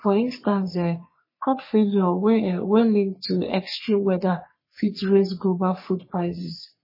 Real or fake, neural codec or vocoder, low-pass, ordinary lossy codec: fake; codec, 44.1 kHz, 3.4 kbps, Pupu-Codec; 5.4 kHz; MP3, 24 kbps